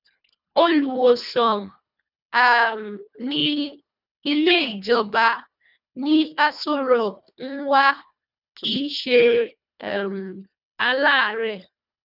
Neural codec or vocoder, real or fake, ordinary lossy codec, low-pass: codec, 24 kHz, 1.5 kbps, HILCodec; fake; none; 5.4 kHz